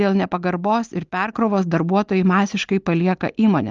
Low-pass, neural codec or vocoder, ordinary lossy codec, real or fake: 7.2 kHz; none; Opus, 24 kbps; real